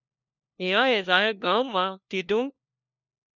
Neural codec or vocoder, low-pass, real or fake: codec, 16 kHz, 1 kbps, FunCodec, trained on LibriTTS, 50 frames a second; 7.2 kHz; fake